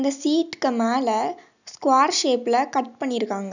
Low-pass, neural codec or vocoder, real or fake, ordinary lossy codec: 7.2 kHz; none; real; none